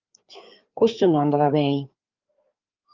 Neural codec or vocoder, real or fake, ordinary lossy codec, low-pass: codec, 16 kHz, 4 kbps, FreqCodec, larger model; fake; Opus, 24 kbps; 7.2 kHz